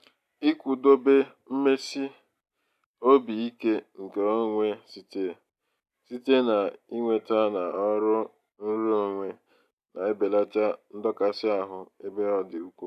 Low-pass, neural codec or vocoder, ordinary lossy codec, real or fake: 14.4 kHz; none; none; real